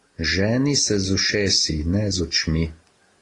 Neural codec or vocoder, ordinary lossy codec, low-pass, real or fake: none; AAC, 32 kbps; 10.8 kHz; real